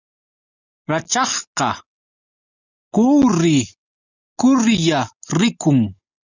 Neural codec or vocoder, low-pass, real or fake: none; 7.2 kHz; real